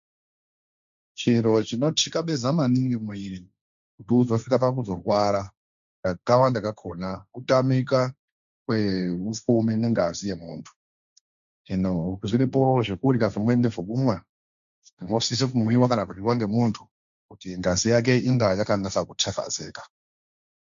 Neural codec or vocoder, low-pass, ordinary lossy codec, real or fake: codec, 16 kHz, 1.1 kbps, Voila-Tokenizer; 7.2 kHz; MP3, 64 kbps; fake